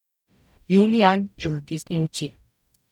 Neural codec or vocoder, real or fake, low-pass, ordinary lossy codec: codec, 44.1 kHz, 0.9 kbps, DAC; fake; 19.8 kHz; none